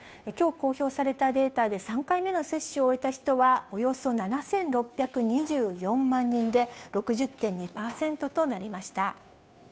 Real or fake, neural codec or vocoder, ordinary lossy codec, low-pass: fake; codec, 16 kHz, 2 kbps, FunCodec, trained on Chinese and English, 25 frames a second; none; none